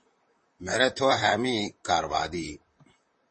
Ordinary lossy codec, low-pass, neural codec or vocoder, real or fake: MP3, 32 kbps; 10.8 kHz; vocoder, 44.1 kHz, 128 mel bands, Pupu-Vocoder; fake